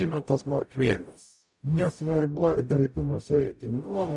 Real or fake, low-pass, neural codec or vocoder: fake; 10.8 kHz; codec, 44.1 kHz, 0.9 kbps, DAC